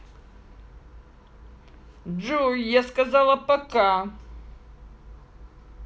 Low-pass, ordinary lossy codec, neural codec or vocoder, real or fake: none; none; none; real